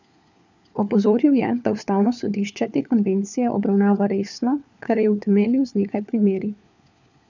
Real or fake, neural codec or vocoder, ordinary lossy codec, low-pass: fake; codec, 16 kHz, 4 kbps, FunCodec, trained on LibriTTS, 50 frames a second; none; 7.2 kHz